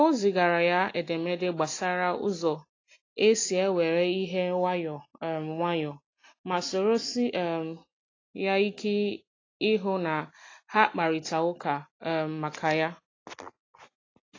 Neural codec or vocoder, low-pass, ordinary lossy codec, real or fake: none; 7.2 kHz; AAC, 32 kbps; real